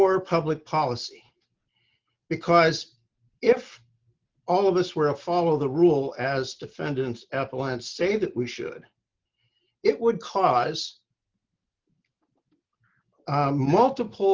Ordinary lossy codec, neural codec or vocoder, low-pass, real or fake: Opus, 32 kbps; none; 7.2 kHz; real